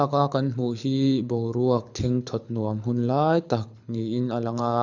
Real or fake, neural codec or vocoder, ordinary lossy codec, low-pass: fake; codec, 24 kHz, 6 kbps, HILCodec; none; 7.2 kHz